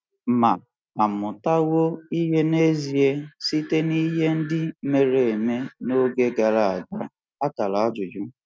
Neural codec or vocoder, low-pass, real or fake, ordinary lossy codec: none; none; real; none